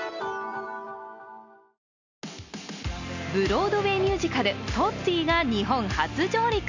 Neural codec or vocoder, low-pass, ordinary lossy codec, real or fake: none; 7.2 kHz; none; real